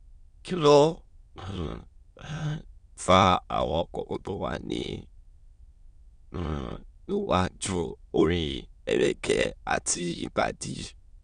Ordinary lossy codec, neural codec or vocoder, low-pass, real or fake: Opus, 64 kbps; autoencoder, 22.05 kHz, a latent of 192 numbers a frame, VITS, trained on many speakers; 9.9 kHz; fake